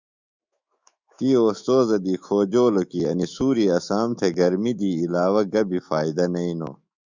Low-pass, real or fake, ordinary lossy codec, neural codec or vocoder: 7.2 kHz; fake; Opus, 64 kbps; autoencoder, 48 kHz, 128 numbers a frame, DAC-VAE, trained on Japanese speech